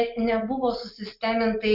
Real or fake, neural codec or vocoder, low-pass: real; none; 5.4 kHz